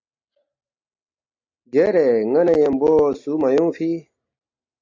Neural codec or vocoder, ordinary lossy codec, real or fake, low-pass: none; AAC, 48 kbps; real; 7.2 kHz